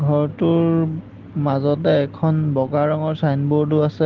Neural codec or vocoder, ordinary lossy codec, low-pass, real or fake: none; Opus, 16 kbps; 7.2 kHz; real